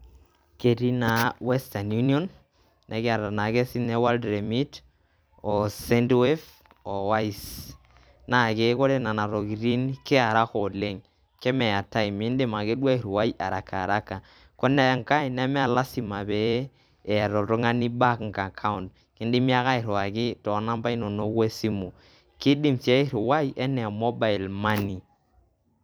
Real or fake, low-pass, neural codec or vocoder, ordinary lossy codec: fake; none; vocoder, 44.1 kHz, 128 mel bands every 256 samples, BigVGAN v2; none